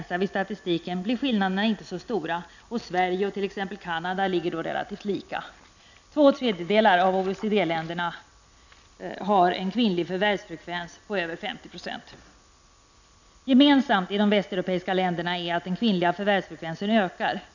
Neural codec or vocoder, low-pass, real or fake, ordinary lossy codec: none; 7.2 kHz; real; none